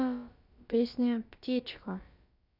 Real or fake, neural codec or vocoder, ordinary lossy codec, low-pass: fake; codec, 16 kHz, about 1 kbps, DyCAST, with the encoder's durations; none; 5.4 kHz